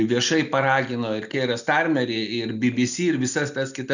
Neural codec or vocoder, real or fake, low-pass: none; real; 7.2 kHz